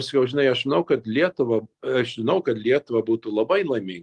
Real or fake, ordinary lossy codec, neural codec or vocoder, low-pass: real; Opus, 24 kbps; none; 10.8 kHz